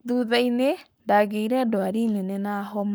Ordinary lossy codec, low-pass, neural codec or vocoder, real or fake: none; none; codec, 44.1 kHz, 7.8 kbps, Pupu-Codec; fake